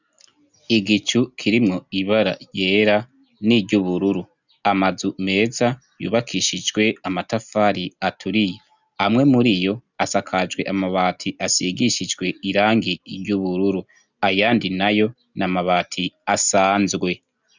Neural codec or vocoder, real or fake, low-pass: none; real; 7.2 kHz